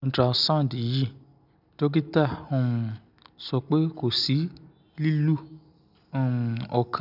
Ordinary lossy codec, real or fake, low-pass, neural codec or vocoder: none; real; 5.4 kHz; none